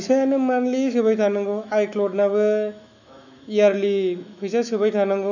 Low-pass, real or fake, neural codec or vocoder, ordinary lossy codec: 7.2 kHz; fake; autoencoder, 48 kHz, 128 numbers a frame, DAC-VAE, trained on Japanese speech; none